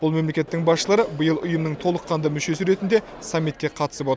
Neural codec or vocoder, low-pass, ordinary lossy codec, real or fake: none; none; none; real